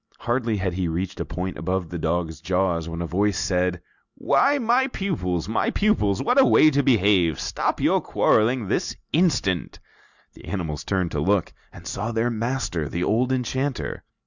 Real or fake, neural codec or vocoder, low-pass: real; none; 7.2 kHz